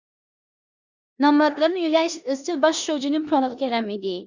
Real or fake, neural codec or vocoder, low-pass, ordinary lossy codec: fake; codec, 16 kHz in and 24 kHz out, 0.9 kbps, LongCat-Audio-Codec, four codebook decoder; 7.2 kHz; Opus, 64 kbps